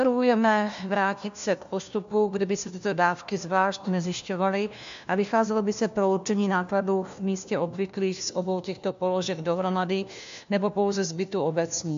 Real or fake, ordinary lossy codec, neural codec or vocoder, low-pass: fake; AAC, 64 kbps; codec, 16 kHz, 1 kbps, FunCodec, trained on LibriTTS, 50 frames a second; 7.2 kHz